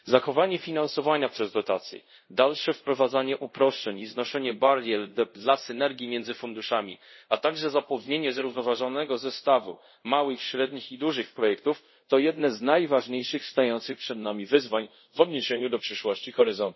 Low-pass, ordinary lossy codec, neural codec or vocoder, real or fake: 7.2 kHz; MP3, 24 kbps; codec, 24 kHz, 0.5 kbps, DualCodec; fake